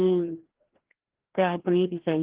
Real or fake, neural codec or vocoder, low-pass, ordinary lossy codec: fake; codec, 16 kHz, 1 kbps, FreqCodec, larger model; 3.6 kHz; Opus, 16 kbps